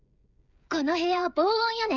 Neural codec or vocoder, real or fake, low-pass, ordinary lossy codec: codec, 16 kHz, 16 kbps, FreqCodec, smaller model; fake; 7.2 kHz; none